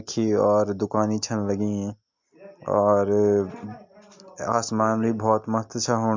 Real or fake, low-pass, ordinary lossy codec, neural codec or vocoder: real; 7.2 kHz; MP3, 64 kbps; none